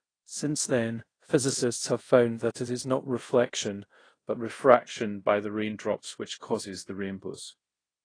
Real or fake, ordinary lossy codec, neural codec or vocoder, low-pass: fake; AAC, 32 kbps; codec, 24 kHz, 0.5 kbps, DualCodec; 9.9 kHz